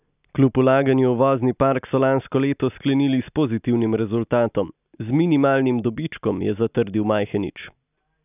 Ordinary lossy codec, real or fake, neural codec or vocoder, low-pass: none; real; none; 3.6 kHz